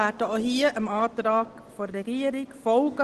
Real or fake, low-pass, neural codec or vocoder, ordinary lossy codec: real; 10.8 kHz; none; Opus, 16 kbps